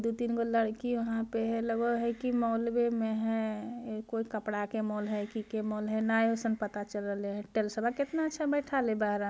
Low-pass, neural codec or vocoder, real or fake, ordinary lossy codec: none; none; real; none